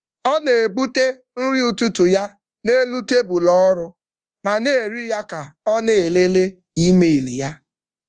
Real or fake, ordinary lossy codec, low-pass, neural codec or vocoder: fake; Opus, 24 kbps; 9.9 kHz; codec, 24 kHz, 1.2 kbps, DualCodec